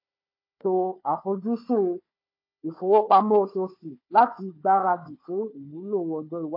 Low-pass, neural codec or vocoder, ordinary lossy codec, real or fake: 5.4 kHz; codec, 16 kHz, 4 kbps, FunCodec, trained on Chinese and English, 50 frames a second; MP3, 32 kbps; fake